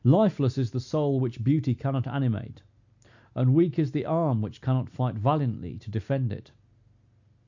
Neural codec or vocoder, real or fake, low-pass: none; real; 7.2 kHz